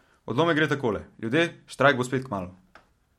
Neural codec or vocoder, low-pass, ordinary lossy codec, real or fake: none; 19.8 kHz; MP3, 64 kbps; real